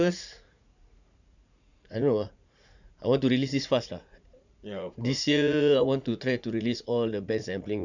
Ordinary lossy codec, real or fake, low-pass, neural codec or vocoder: none; fake; 7.2 kHz; vocoder, 44.1 kHz, 80 mel bands, Vocos